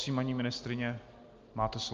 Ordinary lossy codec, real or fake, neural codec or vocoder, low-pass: Opus, 32 kbps; real; none; 7.2 kHz